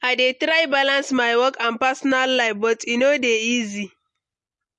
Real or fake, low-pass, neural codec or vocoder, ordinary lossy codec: real; 10.8 kHz; none; MP3, 48 kbps